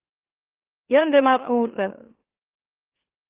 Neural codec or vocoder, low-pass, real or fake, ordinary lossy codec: autoencoder, 44.1 kHz, a latent of 192 numbers a frame, MeloTTS; 3.6 kHz; fake; Opus, 16 kbps